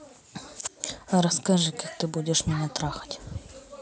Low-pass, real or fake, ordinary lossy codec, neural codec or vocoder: none; real; none; none